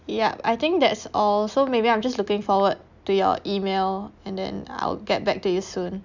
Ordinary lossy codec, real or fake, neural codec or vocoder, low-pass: none; real; none; 7.2 kHz